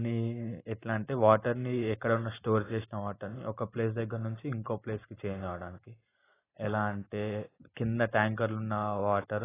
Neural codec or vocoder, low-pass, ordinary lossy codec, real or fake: none; 3.6 kHz; AAC, 16 kbps; real